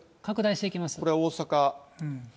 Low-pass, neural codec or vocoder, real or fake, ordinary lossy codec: none; none; real; none